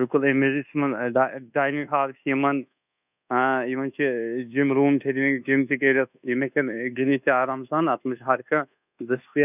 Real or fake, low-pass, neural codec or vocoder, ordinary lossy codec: fake; 3.6 kHz; codec, 24 kHz, 1.2 kbps, DualCodec; none